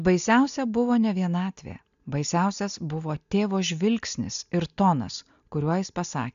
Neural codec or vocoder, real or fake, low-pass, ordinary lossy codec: none; real; 7.2 kHz; AAC, 96 kbps